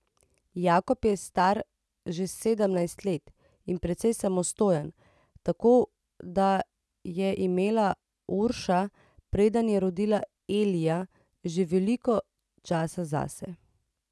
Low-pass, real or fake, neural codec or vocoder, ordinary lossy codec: none; real; none; none